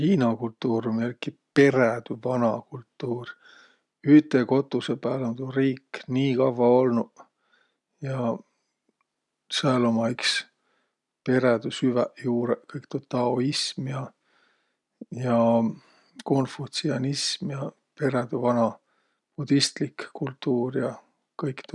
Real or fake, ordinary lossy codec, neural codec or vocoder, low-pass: real; none; none; 10.8 kHz